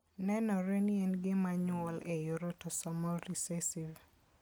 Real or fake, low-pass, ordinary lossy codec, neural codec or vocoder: real; none; none; none